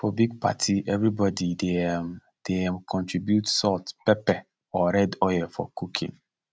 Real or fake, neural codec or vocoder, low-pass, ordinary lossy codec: real; none; none; none